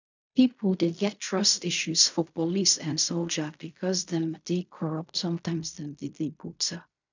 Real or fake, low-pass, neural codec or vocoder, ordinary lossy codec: fake; 7.2 kHz; codec, 16 kHz in and 24 kHz out, 0.4 kbps, LongCat-Audio-Codec, fine tuned four codebook decoder; none